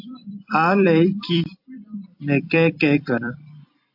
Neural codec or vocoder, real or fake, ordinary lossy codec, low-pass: vocoder, 44.1 kHz, 128 mel bands every 256 samples, BigVGAN v2; fake; AAC, 48 kbps; 5.4 kHz